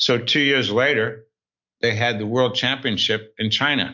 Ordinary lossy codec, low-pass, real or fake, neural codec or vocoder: MP3, 48 kbps; 7.2 kHz; real; none